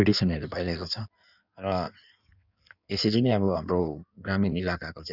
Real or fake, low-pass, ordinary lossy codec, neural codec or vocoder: fake; 5.4 kHz; none; codec, 16 kHz in and 24 kHz out, 1.1 kbps, FireRedTTS-2 codec